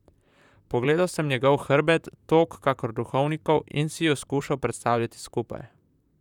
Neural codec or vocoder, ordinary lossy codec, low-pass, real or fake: vocoder, 44.1 kHz, 128 mel bands, Pupu-Vocoder; none; 19.8 kHz; fake